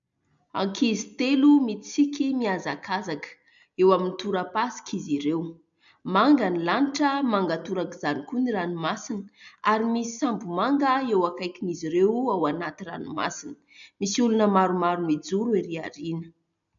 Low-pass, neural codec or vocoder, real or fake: 7.2 kHz; none; real